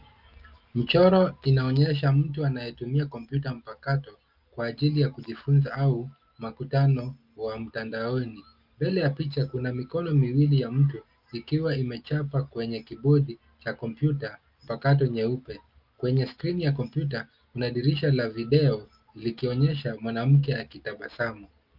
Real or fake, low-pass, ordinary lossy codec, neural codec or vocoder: real; 5.4 kHz; Opus, 24 kbps; none